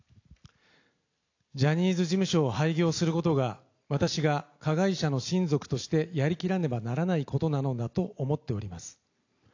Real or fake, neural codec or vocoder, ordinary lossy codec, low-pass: real; none; AAC, 48 kbps; 7.2 kHz